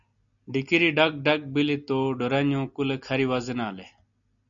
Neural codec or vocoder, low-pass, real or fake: none; 7.2 kHz; real